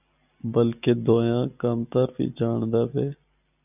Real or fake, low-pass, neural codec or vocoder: real; 3.6 kHz; none